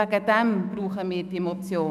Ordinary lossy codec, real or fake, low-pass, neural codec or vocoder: none; fake; 14.4 kHz; autoencoder, 48 kHz, 128 numbers a frame, DAC-VAE, trained on Japanese speech